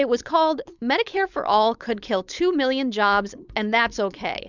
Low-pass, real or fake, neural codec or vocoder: 7.2 kHz; fake; codec, 16 kHz, 4.8 kbps, FACodec